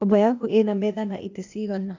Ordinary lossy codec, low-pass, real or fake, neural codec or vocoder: AAC, 48 kbps; 7.2 kHz; fake; codec, 16 kHz, 0.8 kbps, ZipCodec